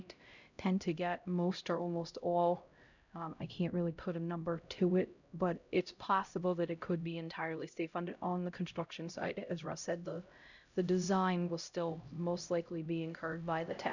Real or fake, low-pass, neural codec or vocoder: fake; 7.2 kHz; codec, 16 kHz, 0.5 kbps, X-Codec, HuBERT features, trained on LibriSpeech